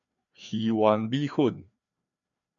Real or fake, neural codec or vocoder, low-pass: fake; codec, 16 kHz, 2 kbps, FreqCodec, larger model; 7.2 kHz